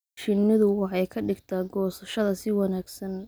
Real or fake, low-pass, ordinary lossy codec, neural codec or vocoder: real; none; none; none